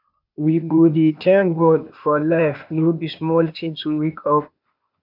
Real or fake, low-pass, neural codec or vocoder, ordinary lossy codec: fake; 5.4 kHz; codec, 16 kHz, 0.8 kbps, ZipCodec; none